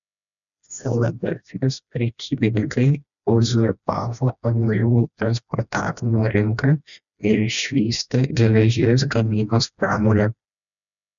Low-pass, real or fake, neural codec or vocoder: 7.2 kHz; fake; codec, 16 kHz, 1 kbps, FreqCodec, smaller model